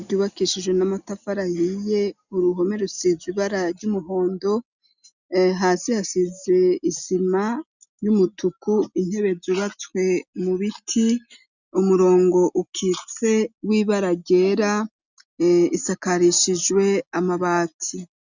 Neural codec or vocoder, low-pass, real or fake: none; 7.2 kHz; real